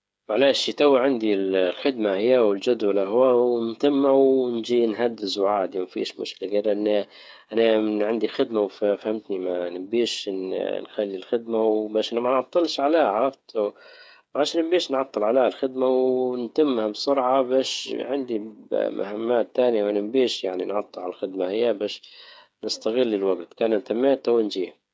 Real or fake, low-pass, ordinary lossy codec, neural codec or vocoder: fake; none; none; codec, 16 kHz, 8 kbps, FreqCodec, smaller model